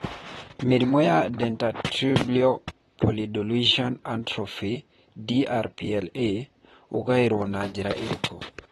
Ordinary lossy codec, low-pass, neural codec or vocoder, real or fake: AAC, 32 kbps; 19.8 kHz; vocoder, 44.1 kHz, 128 mel bands, Pupu-Vocoder; fake